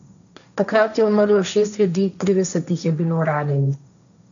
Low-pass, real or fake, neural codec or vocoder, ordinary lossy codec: 7.2 kHz; fake; codec, 16 kHz, 1.1 kbps, Voila-Tokenizer; none